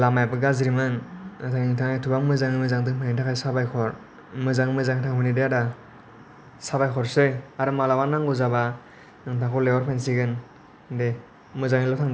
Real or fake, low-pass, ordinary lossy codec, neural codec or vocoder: real; none; none; none